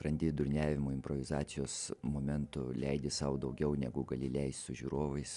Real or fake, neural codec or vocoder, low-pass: real; none; 10.8 kHz